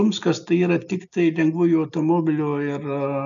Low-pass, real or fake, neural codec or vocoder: 7.2 kHz; real; none